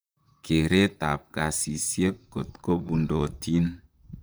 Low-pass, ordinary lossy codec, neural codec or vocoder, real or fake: none; none; vocoder, 44.1 kHz, 128 mel bands, Pupu-Vocoder; fake